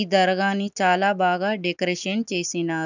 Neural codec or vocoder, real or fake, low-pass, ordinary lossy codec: none; real; 7.2 kHz; none